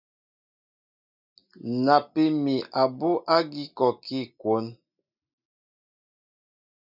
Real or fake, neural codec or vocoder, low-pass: real; none; 5.4 kHz